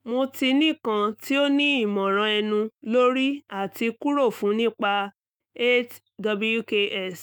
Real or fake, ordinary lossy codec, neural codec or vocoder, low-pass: fake; none; autoencoder, 48 kHz, 128 numbers a frame, DAC-VAE, trained on Japanese speech; none